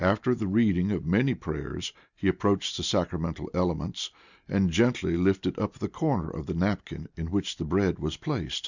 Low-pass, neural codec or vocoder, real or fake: 7.2 kHz; none; real